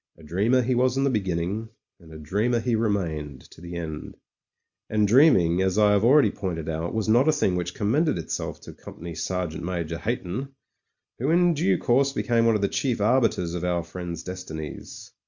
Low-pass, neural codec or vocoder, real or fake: 7.2 kHz; none; real